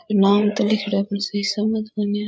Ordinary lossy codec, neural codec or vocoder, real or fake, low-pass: none; codec, 16 kHz, 8 kbps, FreqCodec, larger model; fake; none